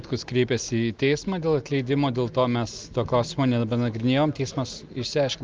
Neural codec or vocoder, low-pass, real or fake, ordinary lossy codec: none; 7.2 kHz; real; Opus, 24 kbps